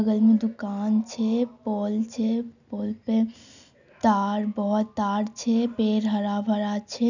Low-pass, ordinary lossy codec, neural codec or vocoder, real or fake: 7.2 kHz; none; none; real